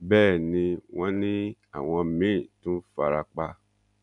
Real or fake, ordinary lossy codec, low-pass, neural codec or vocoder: real; none; 10.8 kHz; none